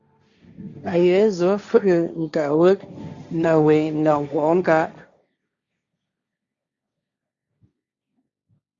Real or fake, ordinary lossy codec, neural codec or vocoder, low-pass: fake; Opus, 64 kbps; codec, 16 kHz, 1.1 kbps, Voila-Tokenizer; 7.2 kHz